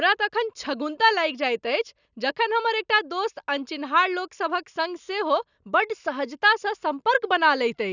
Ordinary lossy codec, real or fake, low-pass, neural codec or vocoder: none; real; 7.2 kHz; none